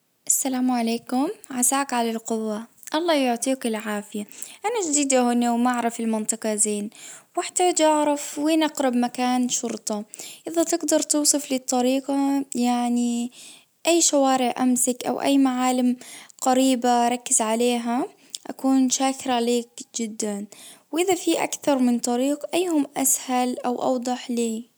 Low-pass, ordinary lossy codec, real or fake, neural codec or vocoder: none; none; real; none